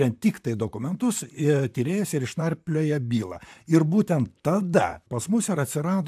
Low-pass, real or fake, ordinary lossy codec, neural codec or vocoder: 14.4 kHz; fake; AAC, 96 kbps; vocoder, 44.1 kHz, 128 mel bands, Pupu-Vocoder